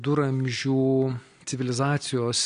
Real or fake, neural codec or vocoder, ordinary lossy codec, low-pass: real; none; AAC, 48 kbps; 9.9 kHz